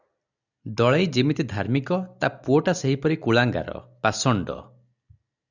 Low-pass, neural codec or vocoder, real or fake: 7.2 kHz; none; real